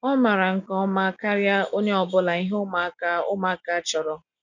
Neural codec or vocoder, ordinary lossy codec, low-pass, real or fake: none; none; 7.2 kHz; real